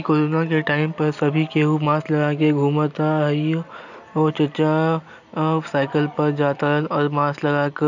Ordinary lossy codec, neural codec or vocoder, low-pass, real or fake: none; none; 7.2 kHz; real